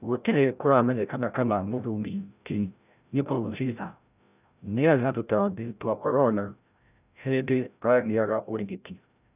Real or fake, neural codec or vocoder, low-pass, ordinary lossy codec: fake; codec, 16 kHz, 0.5 kbps, FreqCodec, larger model; 3.6 kHz; none